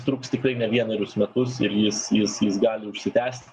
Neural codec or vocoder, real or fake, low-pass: none; real; 10.8 kHz